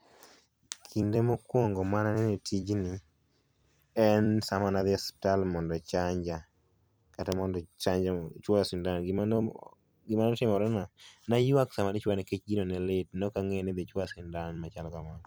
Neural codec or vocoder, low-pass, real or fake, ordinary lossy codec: vocoder, 44.1 kHz, 128 mel bands every 256 samples, BigVGAN v2; none; fake; none